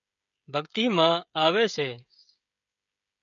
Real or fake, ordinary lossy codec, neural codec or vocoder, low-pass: fake; MP3, 96 kbps; codec, 16 kHz, 16 kbps, FreqCodec, smaller model; 7.2 kHz